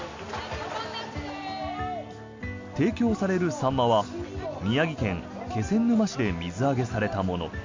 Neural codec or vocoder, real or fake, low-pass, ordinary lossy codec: none; real; 7.2 kHz; MP3, 64 kbps